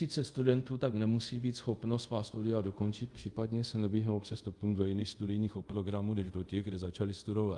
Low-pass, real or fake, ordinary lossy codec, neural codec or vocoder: 10.8 kHz; fake; Opus, 32 kbps; codec, 24 kHz, 0.5 kbps, DualCodec